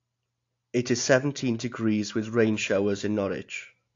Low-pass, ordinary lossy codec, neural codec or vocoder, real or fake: 7.2 kHz; AAC, 48 kbps; none; real